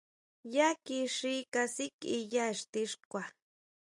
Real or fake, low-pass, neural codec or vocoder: real; 10.8 kHz; none